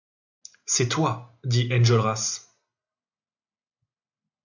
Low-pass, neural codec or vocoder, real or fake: 7.2 kHz; none; real